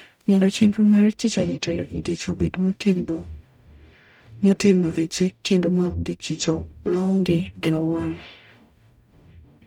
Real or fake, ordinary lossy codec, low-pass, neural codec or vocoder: fake; none; 19.8 kHz; codec, 44.1 kHz, 0.9 kbps, DAC